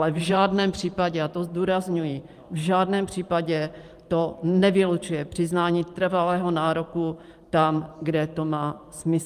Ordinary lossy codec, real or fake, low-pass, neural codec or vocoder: Opus, 32 kbps; fake; 14.4 kHz; vocoder, 44.1 kHz, 128 mel bands every 512 samples, BigVGAN v2